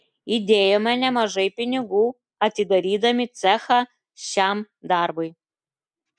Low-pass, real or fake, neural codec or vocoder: 9.9 kHz; real; none